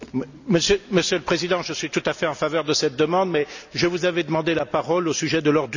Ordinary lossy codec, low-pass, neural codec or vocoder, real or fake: none; 7.2 kHz; none; real